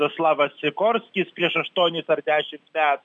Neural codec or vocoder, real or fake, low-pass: none; real; 9.9 kHz